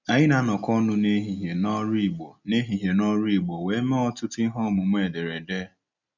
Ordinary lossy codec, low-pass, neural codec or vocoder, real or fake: none; 7.2 kHz; none; real